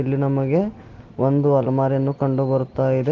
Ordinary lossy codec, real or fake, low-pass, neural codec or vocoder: Opus, 16 kbps; real; 7.2 kHz; none